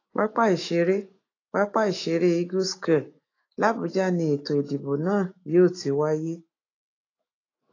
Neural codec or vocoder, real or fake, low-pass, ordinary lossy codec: autoencoder, 48 kHz, 128 numbers a frame, DAC-VAE, trained on Japanese speech; fake; 7.2 kHz; AAC, 32 kbps